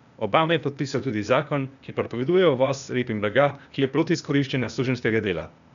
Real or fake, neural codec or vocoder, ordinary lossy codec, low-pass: fake; codec, 16 kHz, 0.8 kbps, ZipCodec; none; 7.2 kHz